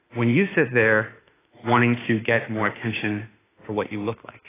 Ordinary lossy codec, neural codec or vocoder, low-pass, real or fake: AAC, 16 kbps; autoencoder, 48 kHz, 32 numbers a frame, DAC-VAE, trained on Japanese speech; 3.6 kHz; fake